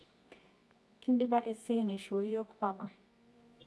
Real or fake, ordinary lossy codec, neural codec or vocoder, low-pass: fake; none; codec, 24 kHz, 0.9 kbps, WavTokenizer, medium music audio release; none